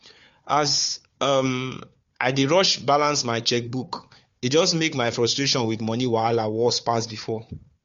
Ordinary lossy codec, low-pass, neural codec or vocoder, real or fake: MP3, 48 kbps; 7.2 kHz; codec, 16 kHz, 4 kbps, FunCodec, trained on Chinese and English, 50 frames a second; fake